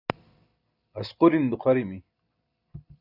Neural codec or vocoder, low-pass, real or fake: none; 5.4 kHz; real